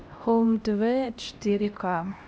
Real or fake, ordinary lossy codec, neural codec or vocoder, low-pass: fake; none; codec, 16 kHz, 1 kbps, X-Codec, HuBERT features, trained on LibriSpeech; none